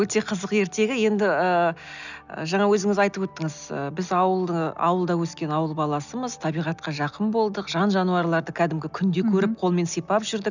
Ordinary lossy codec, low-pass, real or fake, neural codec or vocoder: none; 7.2 kHz; real; none